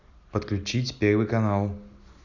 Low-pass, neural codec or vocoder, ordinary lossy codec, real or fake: 7.2 kHz; none; none; real